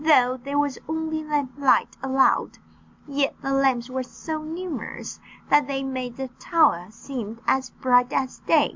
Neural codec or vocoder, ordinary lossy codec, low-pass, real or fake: none; MP3, 64 kbps; 7.2 kHz; real